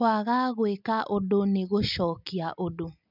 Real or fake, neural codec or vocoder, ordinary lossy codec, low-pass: real; none; none; 5.4 kHz